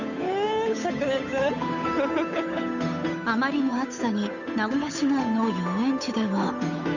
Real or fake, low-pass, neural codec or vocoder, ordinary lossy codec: fake; 7.2 kHz; codec, 16 kHz, 8 kbps, FunCodec, trained on Chinese and English, 25 frames a second; none